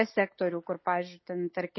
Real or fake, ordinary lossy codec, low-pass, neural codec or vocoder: real; MP3, 24 kbps; 7.2 kHz; none